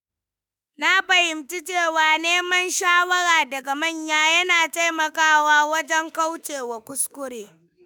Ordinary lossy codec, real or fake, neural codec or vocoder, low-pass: none; fake; autoencoder, 48 kHz, 32 numbers a frame, DAC-VAE, trained on Japanese speech; none